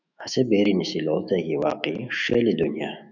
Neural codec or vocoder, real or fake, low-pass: autoencoder, 48 kHz, 128 numbers a frame, DAC-VAE, trained on Japanese speech; fake; 7.2 kHz